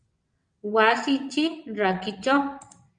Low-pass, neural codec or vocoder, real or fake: 9.9 kHz; vocoder, 22.05 kHz, 80 mel bands, WaveNeXt; fake